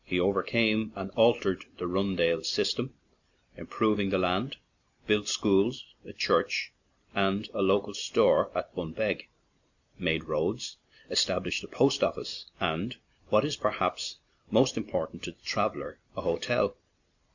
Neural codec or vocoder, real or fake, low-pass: none; real; 7.2 kHz